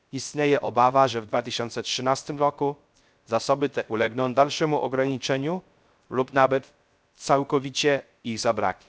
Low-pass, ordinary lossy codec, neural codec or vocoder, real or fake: none; none; codec, 16 kHz, 0.3 kbps, FocalCodec; fake